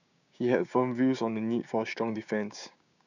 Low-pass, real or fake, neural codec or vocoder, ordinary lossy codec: 7.2 kHz; fake; codec, 16 kHz, 6 kbps, DAC; none